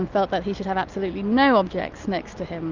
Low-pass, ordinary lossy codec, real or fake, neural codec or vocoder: 7.2 kHz; Opus, 24 kbps; real; none